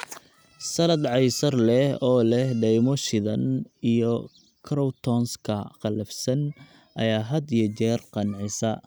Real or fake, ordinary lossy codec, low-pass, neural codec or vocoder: real; none; none; none